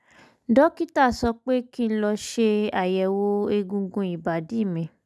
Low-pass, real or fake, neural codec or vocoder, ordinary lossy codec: none; real; none; none